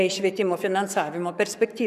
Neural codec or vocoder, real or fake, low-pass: codec, 44.1 kHz, 7.8 kbps, Pupu-Codec; fake; 14.4 kHz